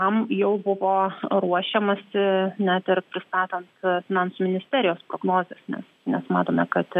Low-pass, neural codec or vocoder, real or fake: 14.4 kHz; none; real